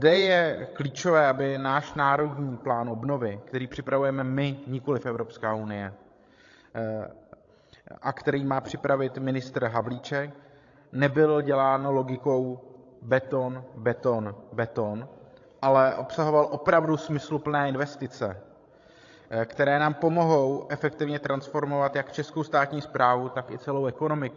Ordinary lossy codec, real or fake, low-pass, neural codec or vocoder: AAC, 48 kbps; fake; 7.2 kHz; codec, 16 kHz, 16 kbps, FreqCodec, larger model